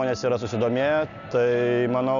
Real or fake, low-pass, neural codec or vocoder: real; 7.2 kHz; none